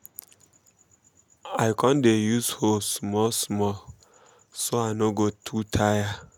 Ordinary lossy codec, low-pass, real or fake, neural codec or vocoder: none; 19.8 kHz; real; none